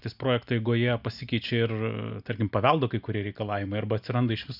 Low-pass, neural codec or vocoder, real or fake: 5.4 kHz; none; real